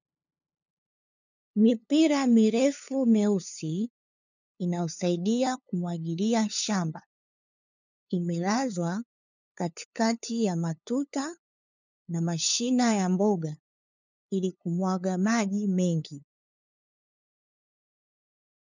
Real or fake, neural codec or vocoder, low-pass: fake; codec, 16 kHz, 2 kbps, FunCodec, trained on LibriTTS, 25 frames a second; 7.2 kHz